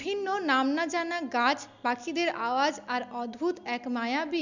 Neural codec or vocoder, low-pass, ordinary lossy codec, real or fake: none; 7.2 kHz; none; real